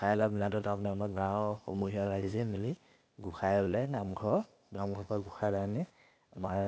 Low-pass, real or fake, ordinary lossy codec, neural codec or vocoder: none; fake; none; codec, 16 kHz, 0.8 kbps, ZipCodec